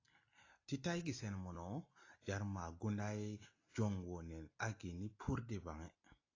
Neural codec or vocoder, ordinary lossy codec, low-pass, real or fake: none; AAC, 32 kbps; 7.2 kHz; real